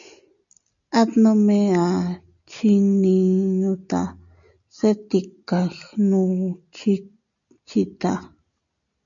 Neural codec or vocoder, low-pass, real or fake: none; 7.2 kHz; real